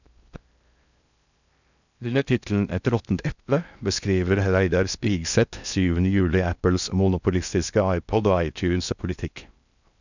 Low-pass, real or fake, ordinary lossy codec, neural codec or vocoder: 7.2 kHz; fake; none; codec, 16 kHz in and 24 kHz out, 0.6 kbps, FocalCodec, streaming, 4096 codes